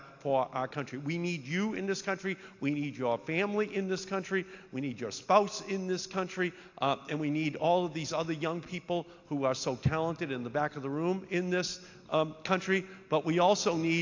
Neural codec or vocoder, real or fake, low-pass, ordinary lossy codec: none; real; 7.2 kHz; AAC, 48 kbps